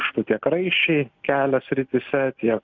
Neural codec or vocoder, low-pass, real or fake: none; 7.2 kHz; real